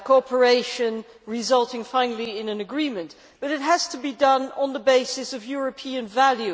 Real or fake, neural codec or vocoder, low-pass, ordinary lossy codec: real; none; none; none